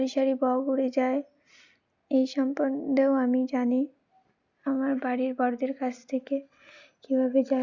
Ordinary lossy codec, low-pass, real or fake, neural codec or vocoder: Opus, 64 kbps; 7.2 kHz; real; none